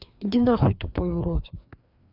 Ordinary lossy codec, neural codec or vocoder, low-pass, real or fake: none; codec, 16 kHz, 2 kbps, FreqCodec, larger model; 5.4 kHz; fake